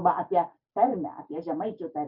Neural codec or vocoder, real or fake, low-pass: none; real; 5.4 kHz